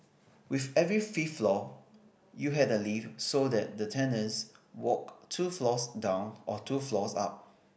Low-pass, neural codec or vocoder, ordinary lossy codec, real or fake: none; none; none; real